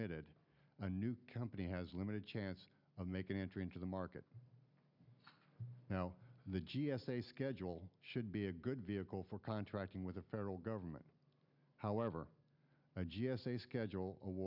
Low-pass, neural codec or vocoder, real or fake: 5.4 kHz; none; real